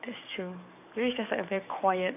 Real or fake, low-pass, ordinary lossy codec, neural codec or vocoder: fake; 3.6 kHz; none; codec, 44.1 kHz, 7.8 kbps, DAC